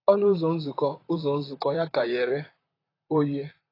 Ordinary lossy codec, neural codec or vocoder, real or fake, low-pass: AAC, 24 kbps; vocoder, 44.1 kHz, 128 mel bands, Pupu-Vocoder; fake; 5.4 kHz